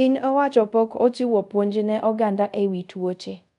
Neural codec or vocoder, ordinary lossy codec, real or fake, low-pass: codec, 24 kHz, 0.5 kbps, DualCodec; none; fake; 10.8 kHz